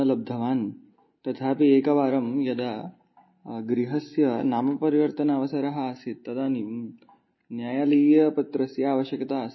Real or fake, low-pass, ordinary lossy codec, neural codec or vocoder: real; 7.2 kHz; MP3, 24 kbps; none